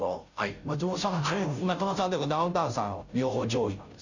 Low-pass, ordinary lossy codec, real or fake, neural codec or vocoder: 7.2 kHz; none; fake; codec, 16 kHz, 0.5 kbps, FunCodec, trained on Chinese and English, 25 frames a second